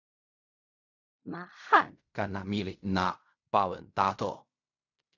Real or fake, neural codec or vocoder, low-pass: fake; codec, 16 kHz in and 24 kHz out, 0.4 kbps, LongCat-Audio-Codec, fine tuned four codebook decoder; 7.2 kHz